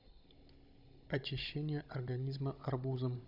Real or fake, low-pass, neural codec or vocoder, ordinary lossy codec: fake; 5.4 kHz; codec, 16 kHz, 16 kbps, FunCodec, trained on Chinese and English, 50 frames a second; none